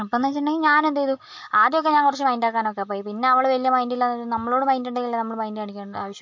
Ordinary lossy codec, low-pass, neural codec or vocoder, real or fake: MP3, 48 kbps; 7.2 kHz; none; real